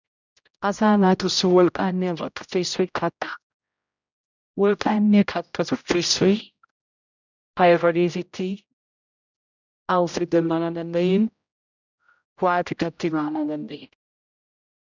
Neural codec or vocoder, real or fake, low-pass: codec, 16 kHz, 0.5 kbps, X-Codec, HuBERT features, trained on general audio; fake; 7.2 kHz